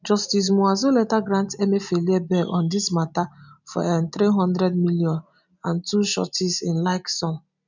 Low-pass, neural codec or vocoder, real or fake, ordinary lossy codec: 7.2 kHz; none; real; none